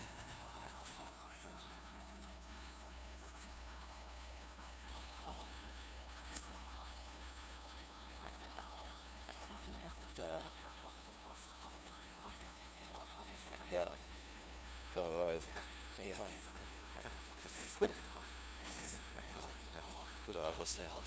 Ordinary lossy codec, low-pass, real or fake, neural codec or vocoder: none; none; fake; codec, 16 kHz, 0.5 kbps, FunCodec, trained on LibriTTS, 25 frames a second